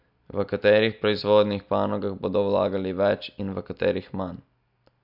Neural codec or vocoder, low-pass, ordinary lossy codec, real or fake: none; 5.4 kHz; none; real